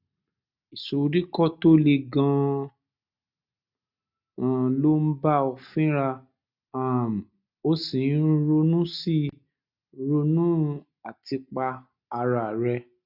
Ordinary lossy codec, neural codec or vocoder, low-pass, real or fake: none; none; 5.4 kHz; real